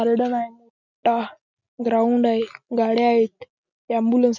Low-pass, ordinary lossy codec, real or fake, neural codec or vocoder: 7.2 kHz; none; real; none